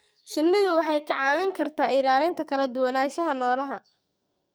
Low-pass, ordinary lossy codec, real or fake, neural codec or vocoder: none; none; fake; codec, 44.1 kHz, 2.6 kbps, SNAC